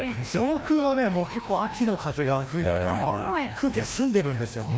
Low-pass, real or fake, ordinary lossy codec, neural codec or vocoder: none; fake; none; codec, 16 kHz, 1 kbps, FreqCodec, larger model